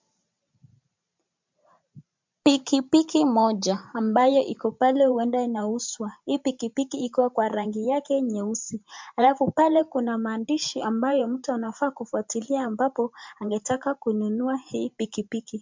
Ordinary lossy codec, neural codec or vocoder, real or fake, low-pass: MP3, 64 kbps; vocoder, 44.1 kHz, 128 mel bands every 512 samples, BigVGAN v2; fake; 7.2 kHz